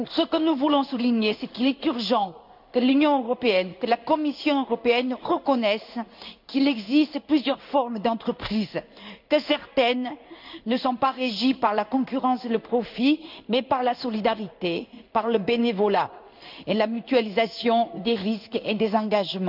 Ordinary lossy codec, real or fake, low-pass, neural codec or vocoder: none; fake; 5.4 kHz; codec, 16 kHz in and 24 kHz out, 1 kbps, XY-Tokenizer